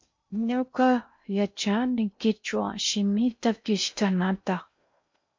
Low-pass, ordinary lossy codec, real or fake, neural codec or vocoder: 7.2 kHz; MP3, 48 kbps; fake; codec, 16 kHz in and 24 kHz out, 0.6 kbps, FocalCodec, streaming, 2048 codes